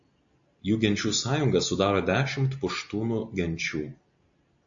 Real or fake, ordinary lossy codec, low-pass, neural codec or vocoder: real; AAC, 64 kbps; 7.2 kHz; none